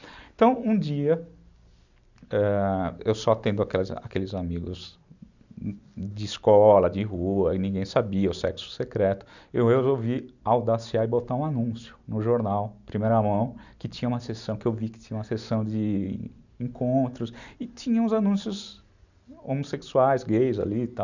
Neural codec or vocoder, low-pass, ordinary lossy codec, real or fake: none; 7.2 kHz; none; real